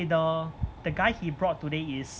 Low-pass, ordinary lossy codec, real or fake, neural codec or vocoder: none; none; real; none